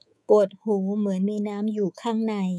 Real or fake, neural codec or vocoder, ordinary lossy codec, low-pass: fake; codec, 24 kHz, 3.1 kbps, DualCodec; none; none